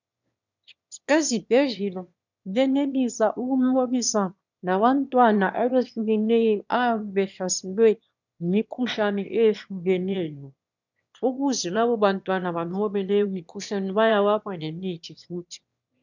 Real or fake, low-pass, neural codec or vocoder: fake; 7.2 kHz; autoencoder, 22.05 kHz, a latent of 192 numbers a frame, VITS, trained on one speaker